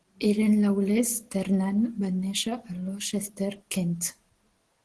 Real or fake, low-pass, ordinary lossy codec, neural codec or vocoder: real; 10.8 kHz; Opus, 16 kbps; none